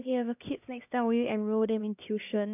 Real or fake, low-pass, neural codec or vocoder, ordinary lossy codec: fake; 3.6 kHz; codec, 16 kHz, 1 kbps, X-Codec, HuBERT features, trained on LibriSpeech; none